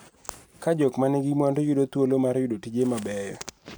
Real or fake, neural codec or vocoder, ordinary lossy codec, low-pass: real; none; none; none